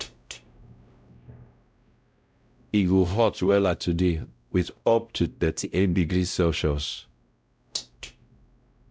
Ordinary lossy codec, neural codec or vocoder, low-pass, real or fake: none; codec, 16 kHz, 0.5 kbps, X-Codec, WavLM features, trained on Multilingual LibriSpeech; none; fake